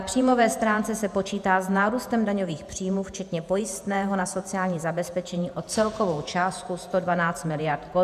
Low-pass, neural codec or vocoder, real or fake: 14.4 kHz; vocoder, 48 kHz, 128 mel bands, Vocos; fake